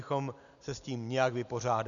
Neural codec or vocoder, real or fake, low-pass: none; real; 7.2 kHz